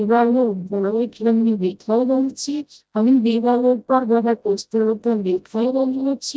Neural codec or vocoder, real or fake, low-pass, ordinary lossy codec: codec, 16 kHz, 0.5 kbps, FreqCodec, smaller model; fake; none; none